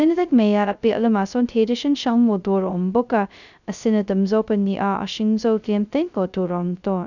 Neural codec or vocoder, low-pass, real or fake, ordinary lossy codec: codec, 16 kHz, 0.2 kbps, FocalCodec; 7.2 kHz; fake; none